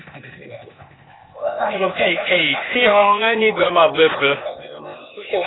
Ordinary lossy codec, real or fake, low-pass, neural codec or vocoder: AAC, 16 kbps; fake; 7.2 kHz; codec, 16 kHz, 0.8 kbps, ZipCodec